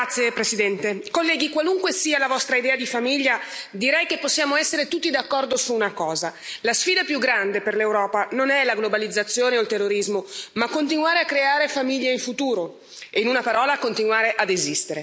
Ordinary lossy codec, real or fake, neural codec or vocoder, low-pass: none; real; none; none